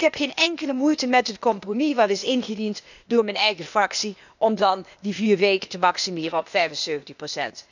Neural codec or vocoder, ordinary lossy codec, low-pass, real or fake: codec, 16 kHz, 0.8 kbps, ZipCodec; none; 7.2 kHz; fake